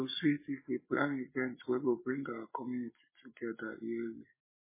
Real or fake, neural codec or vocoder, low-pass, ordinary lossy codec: real; none; 3.6 kHz; MP3, 16 kbps